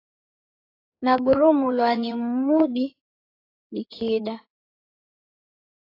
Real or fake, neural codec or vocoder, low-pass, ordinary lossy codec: fake; codec, 16 kHz in and 24 kHz out, 2.2 kbps, FireRedTTS-2 codec; 5.4 kHz; AAC, 24 kbps